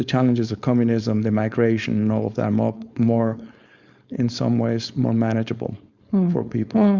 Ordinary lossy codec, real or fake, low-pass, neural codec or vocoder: Opus, 64 kbps; fake; 7.2 kHz; codec, 16 kHz, 4.8 kbps, FACodec